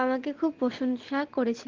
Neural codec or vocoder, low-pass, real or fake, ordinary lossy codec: none; 7.2 kHz; real; Opus, 16 kbps